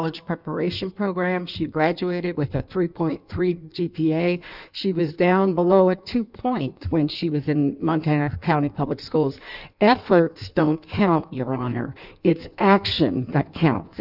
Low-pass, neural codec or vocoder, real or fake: 5.4 kHz; codec, 16 kHz in and 24 kHz out, 1.1 kbps, FireRedTTS-2 codec; fake